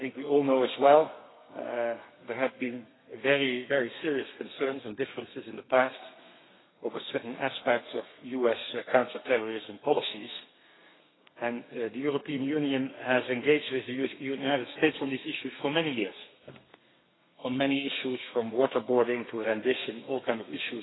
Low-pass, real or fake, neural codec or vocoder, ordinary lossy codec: 7.2 kHz; fake; codec, 32 kHz, 1.9 kbps, SNAC; AAC, 16 kbps